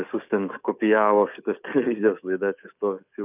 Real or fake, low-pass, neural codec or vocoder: fake; 3.6 kHz; codec, 44.1 kHz, 7.8 kbps, DAC